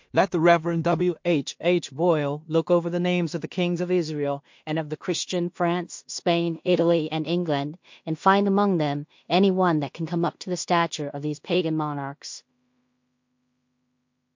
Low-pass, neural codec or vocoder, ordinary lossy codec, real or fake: 7.2 kHz; codec, 16 kHz in and 24 kHz out, 0.4 kbps, LongCat-Audio-Codec, two codebook decoder; MP3, 48 kbps; fake